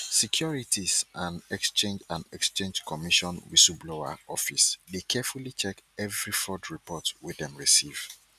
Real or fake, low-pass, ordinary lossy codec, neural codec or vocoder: real; 14.4 kHz; none; none